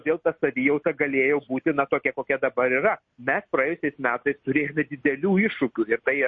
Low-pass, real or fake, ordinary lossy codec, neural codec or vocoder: 7.2 kHz; real; MP3, 32 kbps; none